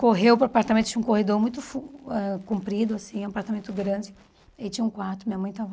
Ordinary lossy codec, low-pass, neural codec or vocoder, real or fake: none; none; none; real